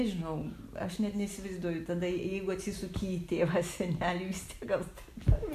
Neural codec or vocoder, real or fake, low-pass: none; real; 14.4 kHz